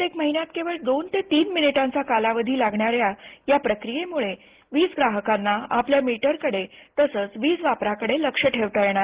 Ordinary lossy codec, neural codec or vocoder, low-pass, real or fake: Opus, 16 kbps; none; 3.6 kHz; real